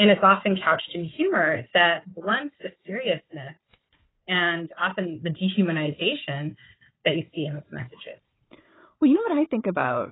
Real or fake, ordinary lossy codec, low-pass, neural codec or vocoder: fake; AAC, 16 kbps; 7.2 kHz; codec, 16 kHz, 4 kbps, FunCodec, trained on Chinese and English, 50 frames a second